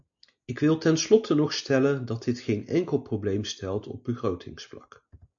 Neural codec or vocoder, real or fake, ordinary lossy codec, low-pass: none; real; MP3, 48 kbps; 7.2 kHz